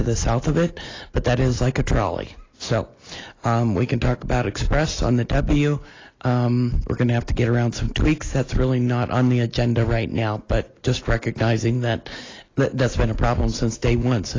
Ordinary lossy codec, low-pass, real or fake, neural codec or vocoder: AAC, 32 kbps; 7.2 kHz; real; none